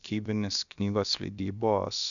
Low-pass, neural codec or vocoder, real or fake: 7.2 kHz; codec, 16 kHz, about 1 kbps, DyCAST, with the encoder's durations; fake